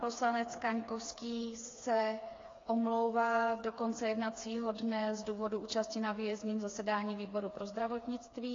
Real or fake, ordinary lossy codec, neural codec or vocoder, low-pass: fake; AAC, 32 kbps; codec, 16 kHz, 4 kbps, FreqCodec, smaller model; 7.2 kHz